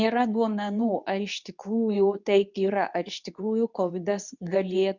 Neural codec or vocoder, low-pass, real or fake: codec, 24 kHz, 0.9 kbps, WavTokenizer, medium speech release version 2; 7.2 kHz; fake